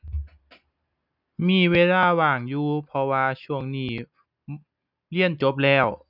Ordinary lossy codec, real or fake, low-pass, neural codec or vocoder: none; real; 5.4 kHz; none